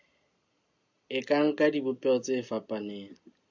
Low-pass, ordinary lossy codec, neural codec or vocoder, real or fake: 7.2 kHz; AAC, 48 kbps; none; real